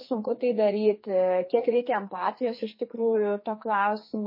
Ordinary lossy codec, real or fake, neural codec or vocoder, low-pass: MP3, 24 kbps; fake; codec, 44.1 kHz, 2.6 kbps, SNAC; 5.4 kHz